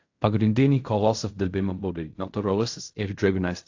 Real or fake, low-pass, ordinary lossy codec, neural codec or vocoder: fake; 7.2 kHz; AAC, 48 kbps; codec, 16 kHz in and 24 kHz out, 0.4 kbps, LongCat-Audio-Codec, fine tuned four codebook decoder